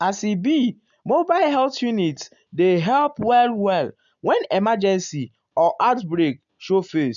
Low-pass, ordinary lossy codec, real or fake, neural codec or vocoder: 7.2 kHz; none; real; none